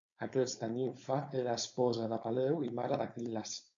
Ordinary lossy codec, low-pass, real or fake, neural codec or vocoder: MP3, 64 kbps; 7.2 kHz; fake; codec, 16 kHz, 4.8 kbps, FACodec